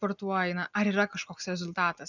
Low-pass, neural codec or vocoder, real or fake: 7.2 kHz; none; real